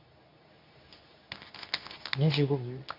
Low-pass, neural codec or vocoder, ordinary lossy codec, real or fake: 5.4 kHz; codec, 24 kHz, 0.9 kbps, WavTokenizer, medium speech release version 2; none; fake